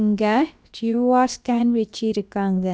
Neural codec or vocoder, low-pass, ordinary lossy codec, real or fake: codec, 16 kHz, about 1 kbps, DyCAST, with the encoder's durations; none; none; fake